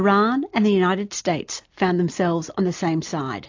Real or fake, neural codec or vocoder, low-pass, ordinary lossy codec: real; none; 7.2 kHz; AAC, 48 kbps